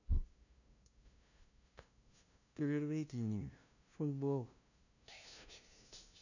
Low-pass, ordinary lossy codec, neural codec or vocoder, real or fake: 7.2 kHz; none; codec, 16 kHz, 0.5 kbps, FunCodec, trained on LibriTTS, 25 frames a second; fake